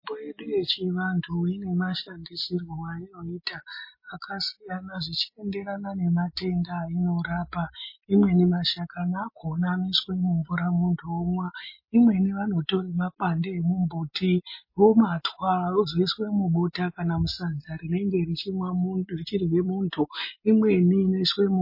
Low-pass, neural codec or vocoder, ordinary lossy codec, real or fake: 5.4 kHz; none; MP3, 24 kbps; real